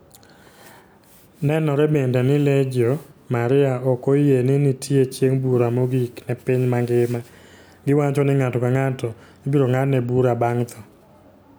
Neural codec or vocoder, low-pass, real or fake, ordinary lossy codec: none; none; real; none